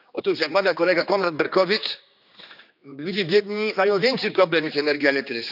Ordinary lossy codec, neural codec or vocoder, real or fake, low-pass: none; codec, 16 kHz, 2 kbps, X-Codec, HuBERT features, trained on general audio; fake; 5.4 kHz